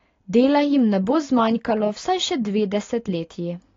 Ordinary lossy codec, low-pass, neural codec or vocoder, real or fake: AAC, 32 kbps; 7.2 kHz; none; real